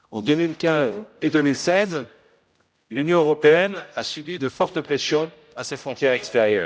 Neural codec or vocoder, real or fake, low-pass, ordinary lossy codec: codec, 16 kHz, 0.5 kbps, X-Codec, HuBERT features, trained on general audio; fake; none; none